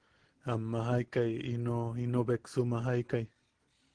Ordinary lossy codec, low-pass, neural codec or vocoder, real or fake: Opus, 16 kbps; 9.9 kHz; none; real